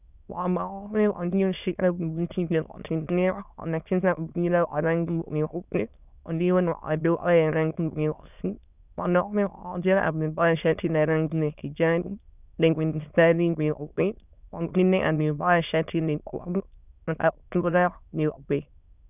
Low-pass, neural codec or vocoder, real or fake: 3.6 kHz; autoencoder, 22.05 kHz, a latent of 192 numbers a frame, VITS, trained on many speakers; fake